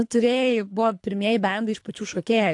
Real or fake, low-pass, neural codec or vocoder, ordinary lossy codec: fake; 10.8 kHz; codec, 24 kHz, 3 kbps, HILCodec; AAC, 64 kbps